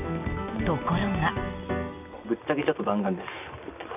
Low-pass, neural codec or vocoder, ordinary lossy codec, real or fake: 3.6 kHz; none; none; real